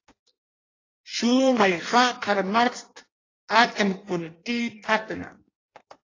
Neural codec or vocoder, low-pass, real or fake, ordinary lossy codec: codec, 16 kHz in and 24 kHz out, 0.6 kbps, FireRedTTS-2 codec; 7.2 kHz; fake; AAC, 32 kbps